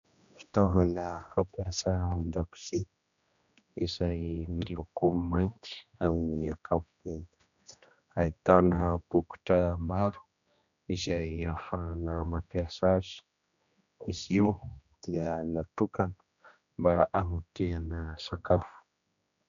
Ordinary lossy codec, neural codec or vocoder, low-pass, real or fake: MP3, 96 kbps; codec, 16 kHz, 1 kbps, X-Codec, HuBERT features, trained on general audio; 7.2 kHz; fake